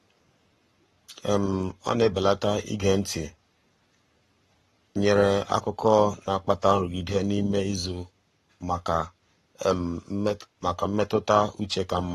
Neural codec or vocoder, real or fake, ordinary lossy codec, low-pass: codec, 44.1 kHz, 7.8 kbps, Pupu-Codec; fake; AAC, 32 kbps; 19.8 kHz